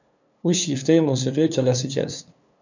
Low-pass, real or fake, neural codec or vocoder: 7.2 kHz; fake; codec, 16 kHz, 2 kbps, FunCodec, trained on LibriTTS, 25 frames a second